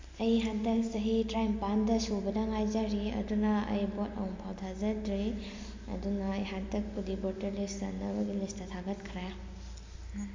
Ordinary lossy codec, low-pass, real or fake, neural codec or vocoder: MP3, 48 kbps; 7.2 kHz; real; none